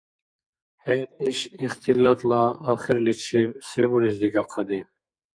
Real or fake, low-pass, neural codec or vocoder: fake; 9.9 kHz; codec, 44.1 kHz, 2.6 kbps, SNAC